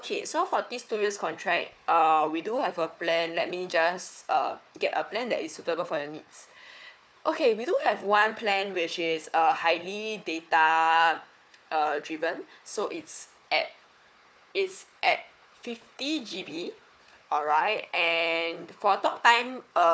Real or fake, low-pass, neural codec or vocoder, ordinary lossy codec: fake; none; codec, 16 kHz, 4 kbps, FunCodec, trained on Chinese and English, 50 frames a second; none